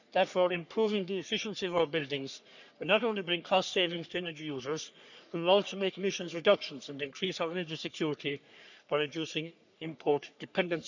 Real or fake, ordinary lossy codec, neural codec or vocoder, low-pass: fake; none; codec, 44.1 kHz, 3.4 kbps, Pupu-Codec; 7.2 kHz